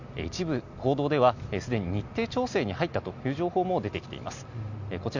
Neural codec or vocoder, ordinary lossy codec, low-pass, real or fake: none; none; 7.2 kHz; real